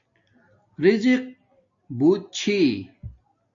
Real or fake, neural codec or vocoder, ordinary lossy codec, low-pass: real; none; AAC, 64 kbps; 7.2 kHz